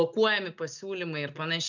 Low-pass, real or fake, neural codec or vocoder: 7.2 kHz; real; none